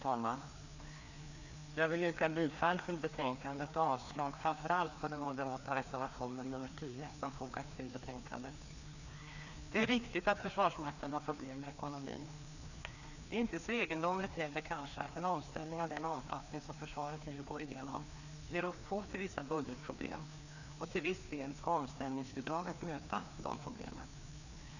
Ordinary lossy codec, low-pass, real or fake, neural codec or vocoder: none; 7.2 kHz; fake; codec, 16 kHz, 2 kbps, FreqCodec, larger model